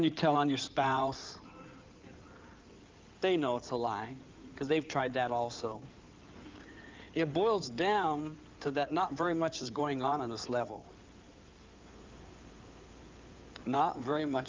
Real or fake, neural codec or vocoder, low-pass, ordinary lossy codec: fake; codec, 16 kHz in and 24 kHz out, 2.2 kbps, FireRedTTS-2 codec; 7.2 kHz; Opus, 24 kbps